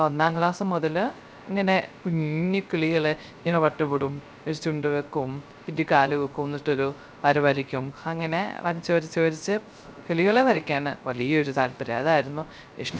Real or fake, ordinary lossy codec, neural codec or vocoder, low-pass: fake; none; codec, 16 kHz, 0.3 kbps, FocalCodec; none